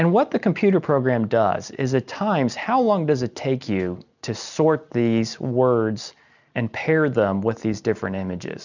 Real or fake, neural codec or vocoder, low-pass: real; none; 7.2 kHz